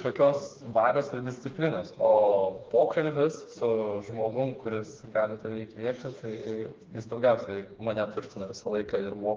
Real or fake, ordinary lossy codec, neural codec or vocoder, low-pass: fake; Opus, 24 kbps; codec, 16 kHz, 2 kbps, FreqCodec, smaller model; 7.2 kHz